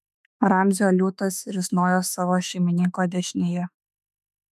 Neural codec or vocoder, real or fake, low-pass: autoencoder, 48 kHz, 32 numbers a frame, DAC-VAE, trained on Japanese speech; fake; 14.4 kHz